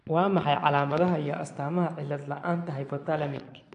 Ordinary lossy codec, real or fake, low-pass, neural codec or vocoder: AAC, 64 kbps; fake; 9.9 kHz; vocoder, 22.05 kHz, 80 mel bands, Vocos